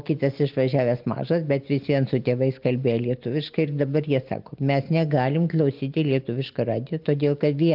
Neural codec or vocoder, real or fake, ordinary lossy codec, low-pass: none; real; Opus, 24 kbps; 5.4 kHz